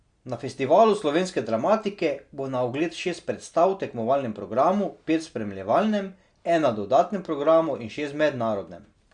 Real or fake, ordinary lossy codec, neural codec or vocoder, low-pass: real; Opus, 64 kbps; none; 9.9 kHz